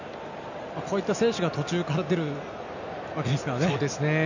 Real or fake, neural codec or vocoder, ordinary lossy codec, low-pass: real; none; none; 7.2 kHz